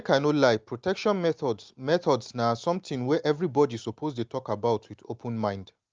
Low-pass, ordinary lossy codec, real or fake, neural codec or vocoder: 7.2 kHz; Opus, 24 kbps; real; none